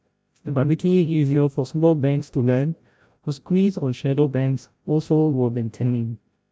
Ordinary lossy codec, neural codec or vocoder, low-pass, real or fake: none; codec, 16 kHz, 0.5 kbps, FreqCodec, larger model; none; fake